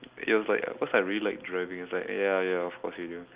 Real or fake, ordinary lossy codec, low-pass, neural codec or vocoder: real; Opus, 32 kbps; 3.6 kHz; none